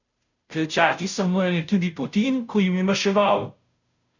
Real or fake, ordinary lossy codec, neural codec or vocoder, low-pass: fake; none; codec, 16 kHz, 0.5 kbps, FunCodec, trained on Chinese and English, 25 frames a second; 7.2 kHz